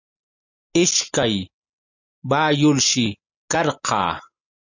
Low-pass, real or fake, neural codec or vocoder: 7.2 kHz; real; none